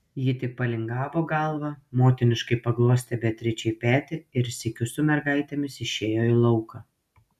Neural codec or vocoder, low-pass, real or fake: none; 14.4 kHz; real